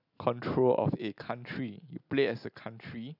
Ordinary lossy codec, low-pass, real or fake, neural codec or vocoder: none; 5.4 kHz; real; none